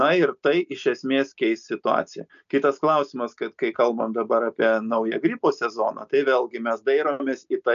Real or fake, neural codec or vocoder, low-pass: real; none; 7.2 kHz